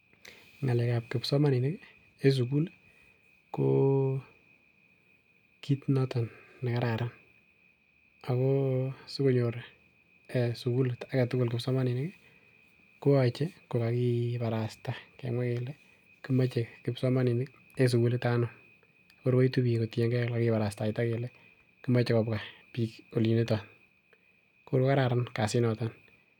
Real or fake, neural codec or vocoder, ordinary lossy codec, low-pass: real; none; none; 19.8 kHz